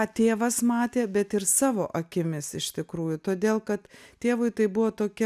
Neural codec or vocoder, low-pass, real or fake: none; 14.4 kHz; real